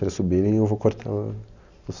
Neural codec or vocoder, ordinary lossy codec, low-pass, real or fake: none; none; 7.2 kHz; real